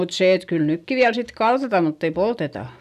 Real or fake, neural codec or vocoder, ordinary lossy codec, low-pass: real; none; none; 14.4 kHz